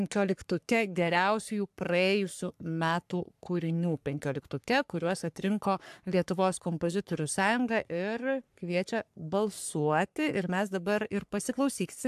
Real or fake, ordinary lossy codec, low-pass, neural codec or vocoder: fake; AAC, 96 kbps; 14.4 kHz; codec, 44.1 kHz, 3.4 kbps, Pupu-Codec